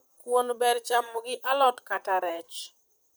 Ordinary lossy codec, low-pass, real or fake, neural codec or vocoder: none; none; fake; vocoder, 44.1 kHz, 128 mel bands, Pupu-Vocoder